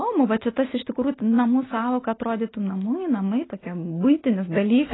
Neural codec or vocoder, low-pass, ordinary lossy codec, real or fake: none; 7.2 kHz; AAC, 16 kbps; real